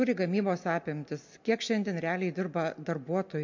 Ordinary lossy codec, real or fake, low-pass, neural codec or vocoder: MP3, 48 kbps; real; 7.2 kHz; none